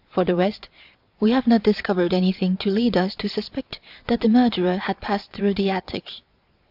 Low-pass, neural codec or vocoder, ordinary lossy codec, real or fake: 5.4 kHz; vocoder, 22.05 kHz, 80 mel bands, Vocos; AAC, 48 kbps; fake